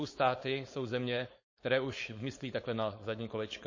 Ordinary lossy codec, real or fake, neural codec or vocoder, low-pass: MP3, 32 kbps; fake; codec, 16 kHz, 4.8 kbps, FACodec; 7.2 kHz